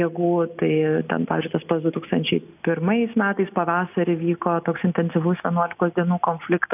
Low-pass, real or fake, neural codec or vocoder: 3.6 kHz; real; none